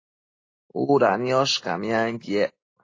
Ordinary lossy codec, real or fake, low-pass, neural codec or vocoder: AAC, 32 kbps; real; 7.2 kHz; none